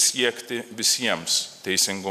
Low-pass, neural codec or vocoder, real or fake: 14.4 kHz; none; real